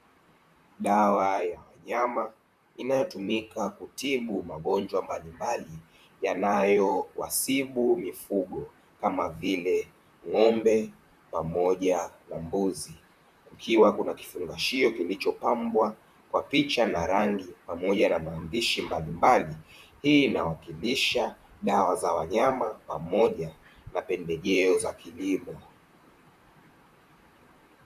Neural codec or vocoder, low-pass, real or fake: vocoder, 44.1 kHz, 128 mel bands, Pupu-Vocoder; 14.4 kHz; fake